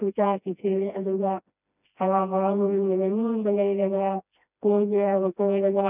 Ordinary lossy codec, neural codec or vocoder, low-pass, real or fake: none; codec, 16 kHz, 1 kbps, FreqCodec, smaller model; 3.6 kHz; fake